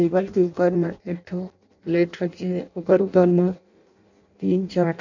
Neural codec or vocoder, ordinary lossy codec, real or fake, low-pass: codec, 16 kHz in and 24 kHz out, 0.6 kbps, FireRedTTS-2 codec; none; fake; 7.2 kHz